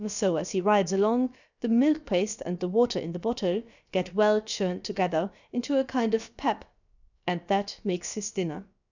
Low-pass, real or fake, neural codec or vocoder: 7.2 kHz; fake; codec, 16 kHz, about 1 kbps, DyCAST, with the encoder's durations